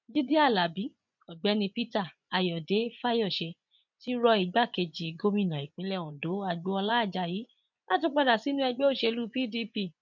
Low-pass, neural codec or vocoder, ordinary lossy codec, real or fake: 7.2 kHz; vocoder, 24 kHz, 100 mel bands, Vocos; none; fake